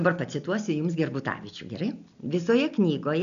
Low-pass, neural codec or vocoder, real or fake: 7.2 kHz; none; real